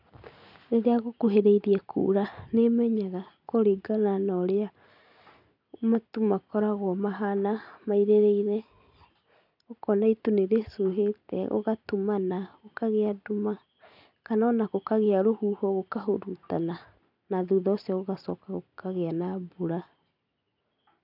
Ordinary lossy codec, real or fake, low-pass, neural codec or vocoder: none; real; 5.4 kHz; none